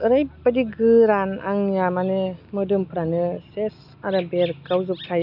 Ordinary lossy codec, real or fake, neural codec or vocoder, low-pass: none; real; none; 5.4 kHz